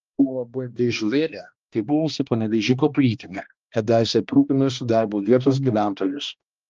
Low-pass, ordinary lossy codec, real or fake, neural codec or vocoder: 7.2 kHz; Opus, 24 kbps; fake; codec, 16 kHz, 1 kbps, X-Codec, HuBERT features, trained on balanced general audio